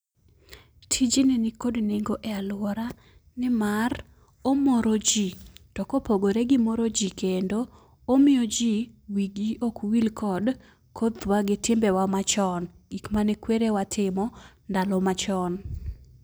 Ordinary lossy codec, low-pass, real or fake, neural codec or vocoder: none; none; real; none